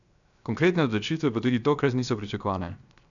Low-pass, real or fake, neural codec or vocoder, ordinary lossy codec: 7.2 kHz; fake; codec, 16 kHz, 0.7 kbps, FocalCodec; none